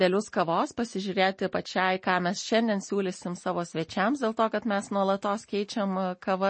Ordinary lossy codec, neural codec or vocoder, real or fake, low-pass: MP3, 32 kbps; none; real; 9.9 kHz